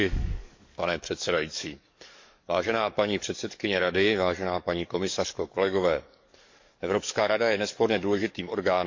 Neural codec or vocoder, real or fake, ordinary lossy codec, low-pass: codec, 44.1 kHz, 7.8 kbps, DAC; fake; MP3, 48 kbps; 7.2 kHz